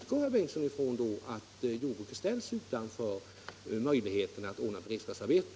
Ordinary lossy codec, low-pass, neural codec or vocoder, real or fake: none; none; none; real